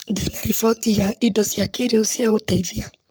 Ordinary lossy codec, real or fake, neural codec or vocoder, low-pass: none; fake; codec, 44.1 kHz, 3.4 kbps, Pupu-Codec; none